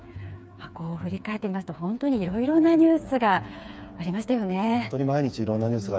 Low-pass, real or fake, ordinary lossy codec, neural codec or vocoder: none; fake; none; codec, 16 kHz, 4 kbps, FreqCodec, smaller model